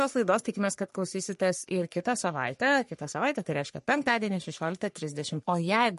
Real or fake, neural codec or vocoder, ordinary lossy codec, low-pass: fake; codec, 44.1 kHz, 3.4 kbps, Pupu-Codec; MP3, 48 kbps; 14.4 kHz